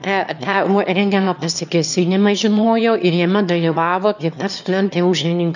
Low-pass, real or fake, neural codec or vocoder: 7.2 kHz; fake; autoencoder, 22.05 kHz, a latent of 192 numbers a frame, VITS, trained on one speaker